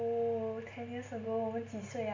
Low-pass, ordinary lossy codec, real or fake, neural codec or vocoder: 7.2 kHz; MP3, 48 kbps; real; none